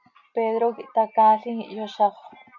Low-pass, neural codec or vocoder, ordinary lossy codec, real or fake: 7.2 kHz; none; MP3, 48 kbps; real